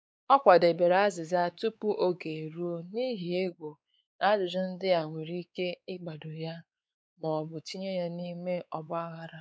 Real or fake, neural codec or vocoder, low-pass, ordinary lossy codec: fake; codec, 16 kHz, 4 kbps, X-Codec, WavLM features, trained on Multilingual LibriSpeech; none; none